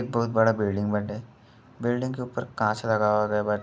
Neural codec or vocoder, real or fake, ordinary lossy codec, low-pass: none; real; none; none